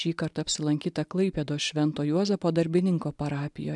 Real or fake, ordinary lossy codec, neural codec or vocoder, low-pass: real; MP3, 96 kbps; none; 10.8 kHz